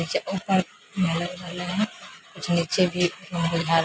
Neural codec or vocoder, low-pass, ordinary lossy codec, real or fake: none; none; none; real